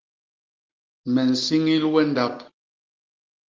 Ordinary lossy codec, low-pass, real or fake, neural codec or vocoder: Opus, 16 kbps; 7.2 kHz; real; none